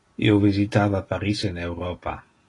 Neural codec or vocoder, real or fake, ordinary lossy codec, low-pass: none; real; AAC, 32 kbps; 10.8 kHz